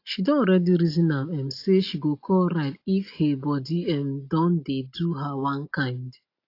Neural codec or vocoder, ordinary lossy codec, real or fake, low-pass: none; AAC, 32 kbps; real; 5.4 kHz